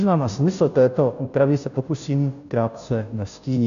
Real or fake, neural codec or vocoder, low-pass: fake; codec, 16 kHz, 0.5 kbps, FunCodec, trained on Chinese and English, 25 frames a second; 7.2 kHz